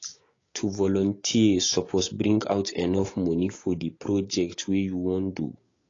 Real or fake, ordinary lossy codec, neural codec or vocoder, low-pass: fake; AAC, 32 kbps; codec, 16 kHz, 6 kbps, DAC; 7.2 kHz